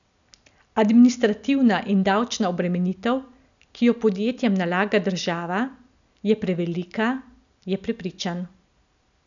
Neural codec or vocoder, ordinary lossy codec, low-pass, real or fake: none; none; 7.2 kHz; real